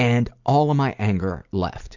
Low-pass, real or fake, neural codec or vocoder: 7.2 kHz; real; none